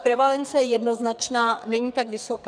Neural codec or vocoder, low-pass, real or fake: codec, 44.1 kHz, 2.6 kbps, SNAC; 9.9 kHz; fake